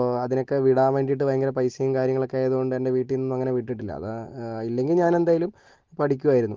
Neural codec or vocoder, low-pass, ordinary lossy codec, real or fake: none; 7.2 kHz; Opus, 16 kbps; real